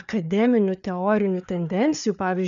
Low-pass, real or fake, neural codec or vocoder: 7.2 kHz; fake; codec, 16 kHz, 4 kbps, FreqCodec, larger model